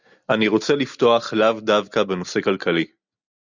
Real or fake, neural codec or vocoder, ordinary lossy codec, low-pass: real; none; Opus, 64 kbps; 7.2 kHz